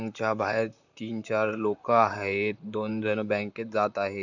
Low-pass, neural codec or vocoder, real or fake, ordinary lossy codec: 7.2 kHz; vocoder, 44.1 kHz, 128 mel bands, Pupu-Vocoder; fake; none